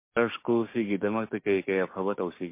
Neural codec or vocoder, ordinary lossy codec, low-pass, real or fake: codec, 16 kHz, 6 kbps, DAC; MP3, 24 kbps; 3.6 kHz; fake